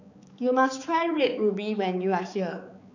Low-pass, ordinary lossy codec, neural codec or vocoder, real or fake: 7.2 kHz; none; codec, 16 kHz, 4 kbps, X-Codec, HuBERT features, trained on balanced general audio; fake